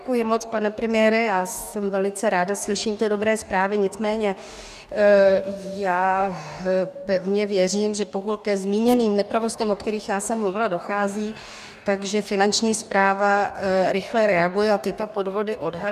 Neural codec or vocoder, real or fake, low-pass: codec, 44.1 kHz, 2.6 kbps, DAC; fake; 14.4 kHz